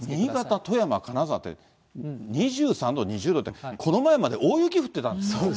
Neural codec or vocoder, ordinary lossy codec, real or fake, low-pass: none; none; real; none